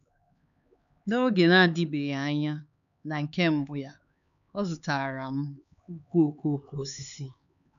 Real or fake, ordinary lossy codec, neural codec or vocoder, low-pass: fake; none; codec, 16 kHz, 4 kbps, X-Codec, HuBERT features, trained on LibriSpeech; 7.2 kHz